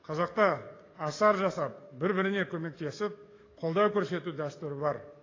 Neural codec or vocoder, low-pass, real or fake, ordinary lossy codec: codec, 44.1 kHz, 7.8 kbps, DAC; 7.2 kHz; fake; AAC, 32 kbps